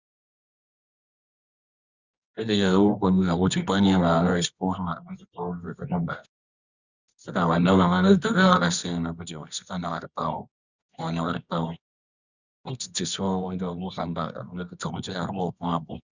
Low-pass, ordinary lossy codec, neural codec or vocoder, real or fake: 7.2 kHz; Opus, 64 kbps; codec, 24 kHz, 0.9 kbps, WavTokenizer, medium music audio release; fake